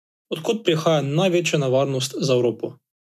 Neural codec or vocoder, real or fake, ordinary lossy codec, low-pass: none; real; none; 14.4 kHz